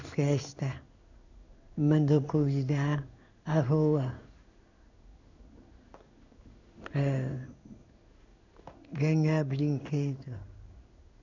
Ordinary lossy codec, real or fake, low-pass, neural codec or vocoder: none; fake; 7.2 kHz; codec, 16 kHz in and 24 kHz out, 1 kbps, XY-Tokenizer